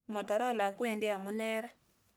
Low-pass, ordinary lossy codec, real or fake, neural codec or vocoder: none; none; fake; codec, 44.1 kHz, 1.7 kbps, Pupu-Codec